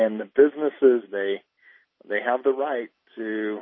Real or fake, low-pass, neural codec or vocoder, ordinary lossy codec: real; 7.2 kHz; none; MP3, 24 kbps